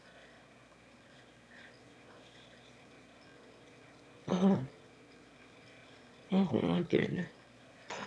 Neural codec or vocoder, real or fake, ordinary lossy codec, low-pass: autoencoder, 22.05 kHz, a latent of 192 numbers a frame, VITS, trained on one speaker; fake; none; none